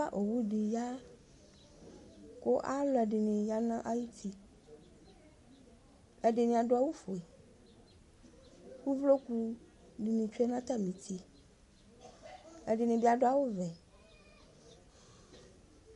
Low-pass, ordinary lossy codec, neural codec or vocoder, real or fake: 14.4 kHz; MP3, 48 kbps; none; real